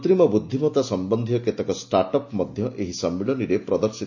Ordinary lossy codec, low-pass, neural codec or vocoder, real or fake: AAC, 48 kbps; 7.2 kHz; none; real